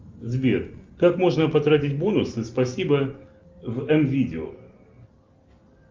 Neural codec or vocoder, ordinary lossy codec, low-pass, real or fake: none; Opus, 32 kbps; 7.2 kHz; real